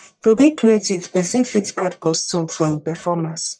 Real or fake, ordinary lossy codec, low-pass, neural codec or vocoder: fake; none; 9.9 kHz; codec, 44.1 kHz, 1.7 kbps, Pupu-Codec